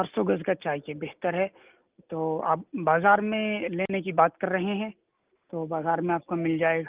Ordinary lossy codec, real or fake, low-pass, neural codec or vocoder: Opus, 24 kbps; real; 3.6 kHz; none